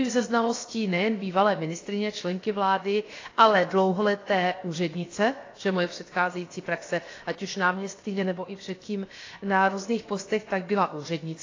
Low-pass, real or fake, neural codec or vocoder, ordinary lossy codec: 7.2 kHz; fake; codec, 16 kHz, 0.7 kbps, FocalCodec; AAC, 32 kbps